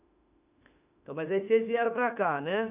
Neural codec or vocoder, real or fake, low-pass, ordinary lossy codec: autoencoder, 48 kHz, 32 numbers a frame, DAC-VAE, trained on Japanese speech; fake; 3.6 kHz; none